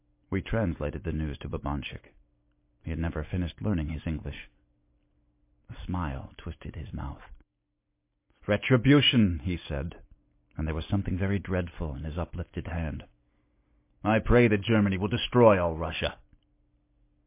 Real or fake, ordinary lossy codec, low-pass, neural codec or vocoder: real; MP3, 24 kbps; 3.6 kHz; none